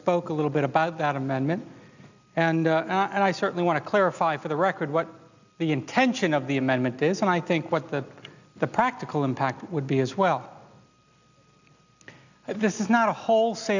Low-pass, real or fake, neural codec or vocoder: 7.2 kHz; real; none